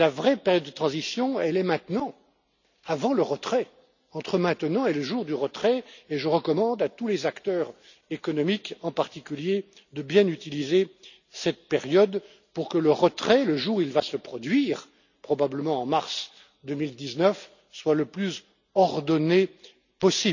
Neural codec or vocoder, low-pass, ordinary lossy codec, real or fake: none; 7.2 kHz; none; real